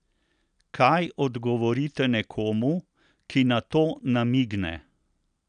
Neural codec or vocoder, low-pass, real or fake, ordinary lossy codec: none; 9.9 kHz; real; none